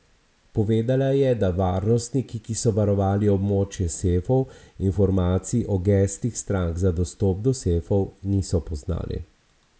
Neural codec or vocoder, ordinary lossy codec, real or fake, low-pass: none; none; real; none